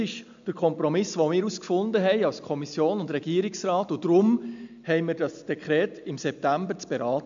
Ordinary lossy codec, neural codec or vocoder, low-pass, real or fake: MP3, 64 kbps; none; 7.2 kHz; real